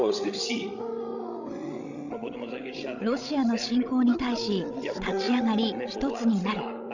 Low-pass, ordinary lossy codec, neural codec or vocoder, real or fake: 7.2 kHz; none; codec, 16 kHz, 16 kbps, FreqCodec, larger model; fake